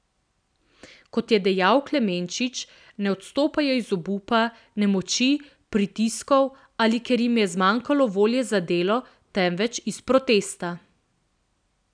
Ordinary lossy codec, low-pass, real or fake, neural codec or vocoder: none; 9.9 kHz; real; none